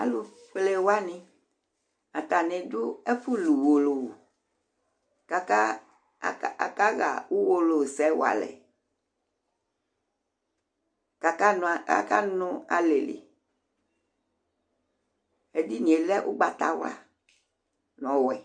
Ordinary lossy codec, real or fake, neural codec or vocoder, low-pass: MP3, 48 kbps; real; none; 9.9 kHz